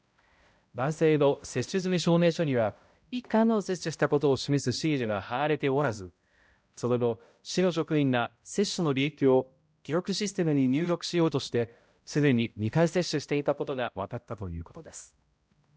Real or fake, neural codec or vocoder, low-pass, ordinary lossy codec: fake; codec, 16 kHz, 0.5 kbps, X-Codec, HuBERT features, trained on balanced general audio; none; none